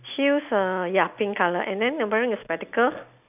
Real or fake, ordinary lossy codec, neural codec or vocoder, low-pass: real; none; none; 3.6 kHz